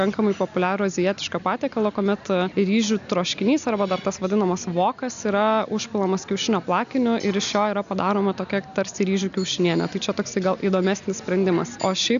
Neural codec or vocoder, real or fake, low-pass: none; real; 7.2 kHz